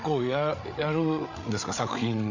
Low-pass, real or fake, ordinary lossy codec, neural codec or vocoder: 7.2 kHz; fake; none; codec, 16 kHz, 8 kbps, FreqCodec, larger model